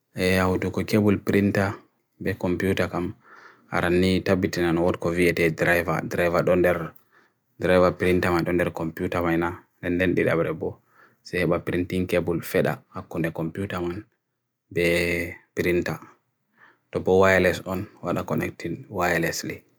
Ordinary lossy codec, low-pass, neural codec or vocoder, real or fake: none; none; none; real